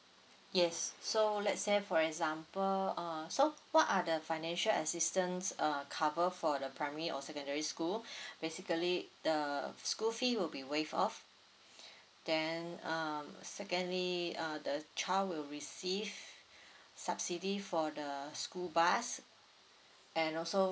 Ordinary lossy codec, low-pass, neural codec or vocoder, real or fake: none; none; none; real